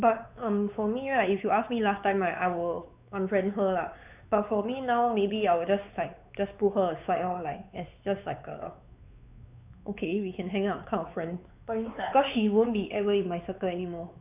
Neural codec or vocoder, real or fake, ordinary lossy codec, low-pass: codec, 16 kHz, 4 kbps, X-Codec, WavLM features, trained on Multilingual LibriSpeech; fake; none; 3.6 kHz